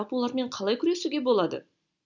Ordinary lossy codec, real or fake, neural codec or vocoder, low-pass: none; real; none; 7.2 kHz